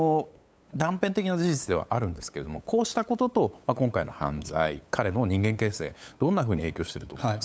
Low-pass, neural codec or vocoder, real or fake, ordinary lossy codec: none; codec, 16 kHz, 8 kbps, FunCodec, trained on LibriTTS, 25 frames a second; fake; none